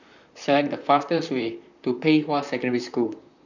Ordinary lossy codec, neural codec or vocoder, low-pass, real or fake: none; vocoder, 44.1 kHz, 128 mel bands, Pupu-Vocoder; 7.2 kHz; fake